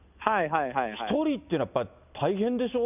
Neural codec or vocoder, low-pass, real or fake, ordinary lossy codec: none; 3.6 kHz; real; none